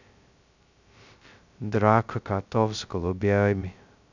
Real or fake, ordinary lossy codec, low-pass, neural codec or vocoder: fake; none; 7.2 kHz; codec, 16 kHz, 0.2 kbps, FocalCodec